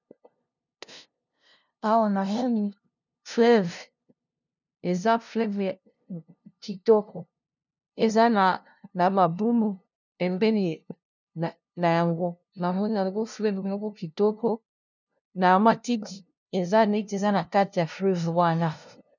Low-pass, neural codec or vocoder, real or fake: 7.2 kHz; codec, 16 kHz, 0.5 kbps, FunCodec, trained on LibriTTS, 25 frames a second; fake